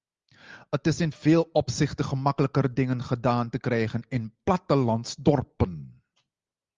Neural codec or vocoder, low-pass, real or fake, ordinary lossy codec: none; 7.2 kHz; real; Opus, 32 kbps